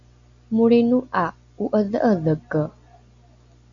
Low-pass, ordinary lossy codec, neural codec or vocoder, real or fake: 7.2 kHz; AAC, 64 kbps; none; real